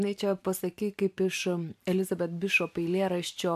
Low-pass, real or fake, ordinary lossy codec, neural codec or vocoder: 14.4 kHz; fake; AAC, 96 kbps; vocoder, 44.1 kHz, 128 mel bands every 512 samples, BigVGAN v2